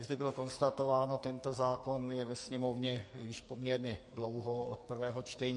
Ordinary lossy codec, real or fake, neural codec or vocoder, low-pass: MP3, 48 kbps; fake; codec, 44.1 kHz, 2.6 kbps, SNAC; 10.8 kHz